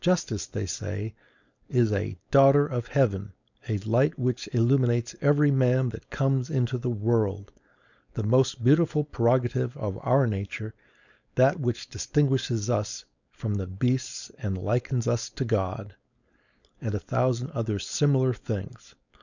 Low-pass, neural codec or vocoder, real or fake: 7.2 kHz; codec, 16 kHz, 4.8 kbps, FACodec; fake